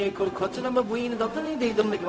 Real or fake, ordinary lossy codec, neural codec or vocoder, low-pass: fake; none; codec, 16 kHz, 0.4 kbps, LongCat-Audio-Codec; none